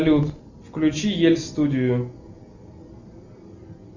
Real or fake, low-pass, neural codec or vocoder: real; 7.2 kHz; none